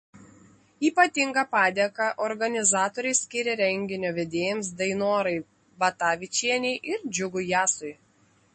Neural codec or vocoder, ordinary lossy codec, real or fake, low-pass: none; MP3, 32 kbps; real; 10.8 kHz